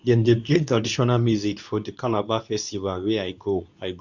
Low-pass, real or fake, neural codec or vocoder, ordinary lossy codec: 7.2 kHz; fake; codec, 24 kHz, 0.9 kbps, WavTokenizer, medium speech release version 2; none